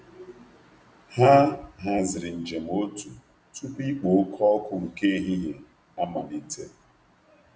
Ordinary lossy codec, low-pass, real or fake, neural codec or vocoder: none; none; real; none